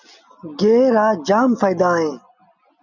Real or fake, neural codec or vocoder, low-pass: real; none; 7.2 kHz